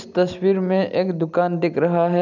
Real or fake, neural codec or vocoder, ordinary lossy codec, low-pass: real; none; none; 7.2 kHz